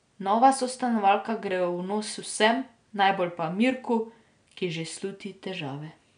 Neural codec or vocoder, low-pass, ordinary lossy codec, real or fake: none; 9.9 kHz; none; real